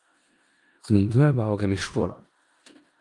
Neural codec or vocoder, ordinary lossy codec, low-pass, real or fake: codec, 16 kHz in and 24 kHz out, 0.4 kbps, LongCat-Audio-Codec, four codebook decoder; Opus, 32 kbps; 10.8 kHz; fake